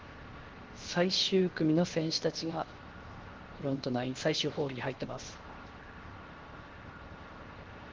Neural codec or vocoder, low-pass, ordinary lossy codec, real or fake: codec, 16 kHz, 0.7 kbps, FocalCodec; 7.2 kHz; Opus, 16 kbps; fake